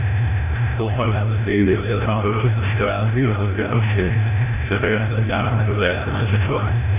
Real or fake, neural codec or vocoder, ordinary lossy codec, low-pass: fake; codec, 16 kHz, 0.5 kbps, FreqCodec, larger model; none; 3.6 kHz